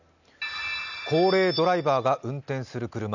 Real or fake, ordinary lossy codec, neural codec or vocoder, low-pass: real; none; none; 7.2 kHz